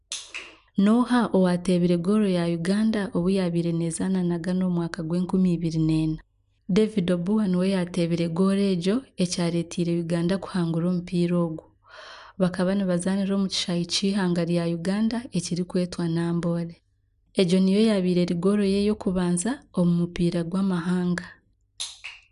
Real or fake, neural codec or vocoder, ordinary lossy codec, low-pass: real; none; none; 10.8 kHz